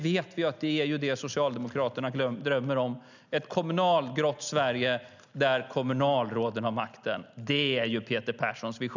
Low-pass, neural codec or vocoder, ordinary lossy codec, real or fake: 7.2 kHz; none; none; real